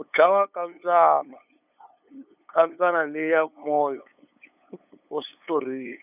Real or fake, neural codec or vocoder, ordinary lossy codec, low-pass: fake; codec, 16 kHz, 8 kbps, FunCodec, trained on LibriTTS, 25 frames a second; none; 3.6 kHz